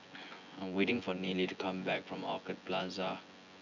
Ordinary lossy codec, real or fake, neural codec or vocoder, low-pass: none; fake; vocoder, 24 kHz, 100 mel bands, Vocos; 7.2 kHz